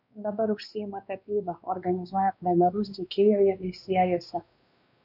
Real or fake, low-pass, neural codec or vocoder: fake; 5.4 kHz; codec, 16 kHz, 2 kbps, X-Codec, WavLM features, trained on Multilingual LibriSpeech